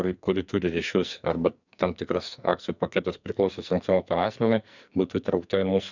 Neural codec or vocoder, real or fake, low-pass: codec, 44.1 kHz, 2.6 kbps, SNAC; fake; 7.2 kHz